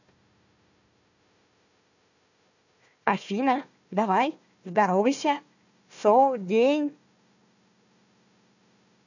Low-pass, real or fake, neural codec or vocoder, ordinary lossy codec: 7.2 kHz; fake; codec, 16 kHz, 1 kbps, FunCodec, trained on Chinese and English, 50 frames a second; none